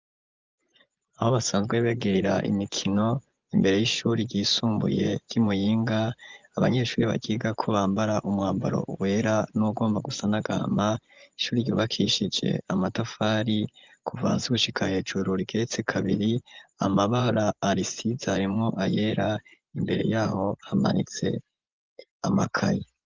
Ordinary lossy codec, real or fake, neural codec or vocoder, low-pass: Opus, 32 kbps; fake; vocoder, 24 kHz, 100 mel bands, Vocos; 7.2 kHz